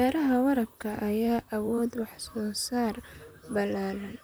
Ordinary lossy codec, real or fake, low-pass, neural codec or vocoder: none; fake; none; vocoder, 44.1 kHz, 128 mel bands, Pupu-Vocoder